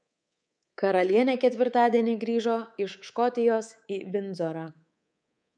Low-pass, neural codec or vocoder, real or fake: 9.9 kHz; codec, 24 kHz, 3.1 kbps, DualCodec; fake